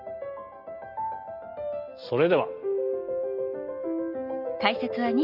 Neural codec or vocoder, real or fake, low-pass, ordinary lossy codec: none; real; 5.4 kHz; none